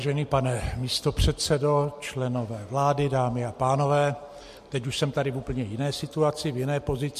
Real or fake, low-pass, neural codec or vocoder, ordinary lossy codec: real; 14.4 kHz; none; MP3, 64 kbps